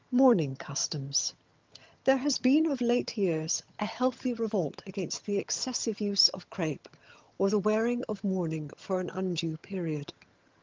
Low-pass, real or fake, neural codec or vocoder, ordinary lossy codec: 7.2 kHz; fake; vocoder, 22.05 kHz, 80 mel bands, HiFi-GAN; Opus, 24 kbps